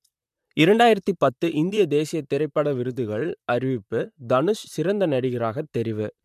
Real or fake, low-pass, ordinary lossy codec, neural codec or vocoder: fake; 14.4 kHz; MP3, 96 kbps; vocoder, 44.1 kHz, 128 mel bands, Pupu-Vocoder